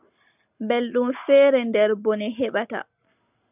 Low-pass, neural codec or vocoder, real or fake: 3.6 kHz; none; real